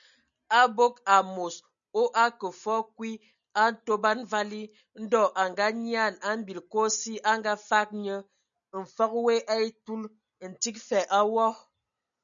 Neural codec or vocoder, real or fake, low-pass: none; real; 7.2 kHz